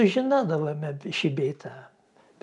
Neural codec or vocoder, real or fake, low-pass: none; real; 10.8 kHz